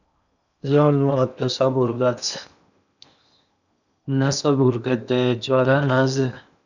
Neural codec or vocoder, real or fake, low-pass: codec, 16 kHz in and 24 kHz out, 0.8 kbps, FocalCodec, streaming, 65536 codes; fake; 7.2 kHz